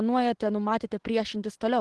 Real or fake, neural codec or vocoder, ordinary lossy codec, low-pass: real; none; Opus, 16 kbps; 10.8 kHz